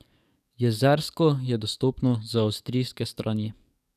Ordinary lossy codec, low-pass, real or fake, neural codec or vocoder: none; 14.4 kHz; fake; codec, 44.1 kHz, 7.8 kbps, DAC